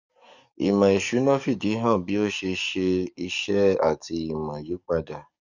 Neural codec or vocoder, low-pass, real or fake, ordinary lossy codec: codec, 44.1 kHz, 7.8 kbps, DAC; 7.2 kHz; fake; Opus, 64 kbps